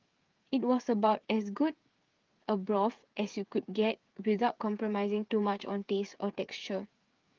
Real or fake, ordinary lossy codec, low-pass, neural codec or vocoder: fake; Opus, 32 kbps; 7.2 kHz; codec, 16 kHz, 8 kbps, FreqCodec, smaller model